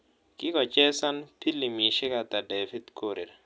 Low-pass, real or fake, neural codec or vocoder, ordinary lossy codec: none; real; none; none